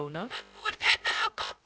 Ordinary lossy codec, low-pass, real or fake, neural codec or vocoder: none; none; fake; codec, 16 kHz, 0.2 kbps, FocalCodec